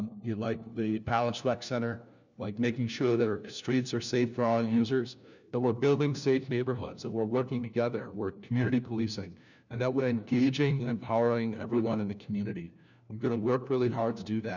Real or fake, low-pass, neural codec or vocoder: fake; 7.2 kHz; codec, 16 kHz, 1 kbps, FunCodec, trained on LibriTTS, 50 frames a second